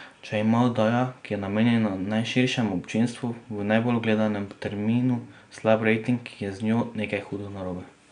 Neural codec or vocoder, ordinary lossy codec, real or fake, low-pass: none; none; real; 9.9 kHz